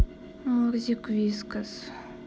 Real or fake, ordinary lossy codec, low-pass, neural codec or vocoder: real; none; none; none